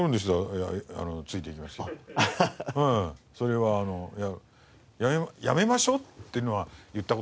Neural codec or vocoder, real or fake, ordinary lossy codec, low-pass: none; real; none; none